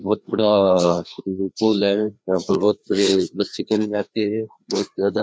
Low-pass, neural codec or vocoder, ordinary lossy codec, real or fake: none; codec, 16 kHz, 2 kbps, FreqCodec, larger model; none; fake